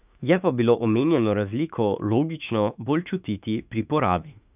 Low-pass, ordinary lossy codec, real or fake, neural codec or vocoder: 3.6 kHz; none; fake; autoencoder, 48 kHz, 32 numbers a frame, DAC-VAE, trained on Japanese speech